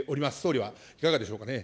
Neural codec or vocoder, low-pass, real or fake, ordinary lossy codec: none; none; real; none